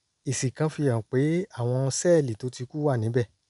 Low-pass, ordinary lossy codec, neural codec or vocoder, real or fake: 10.8 kHz; none; none; real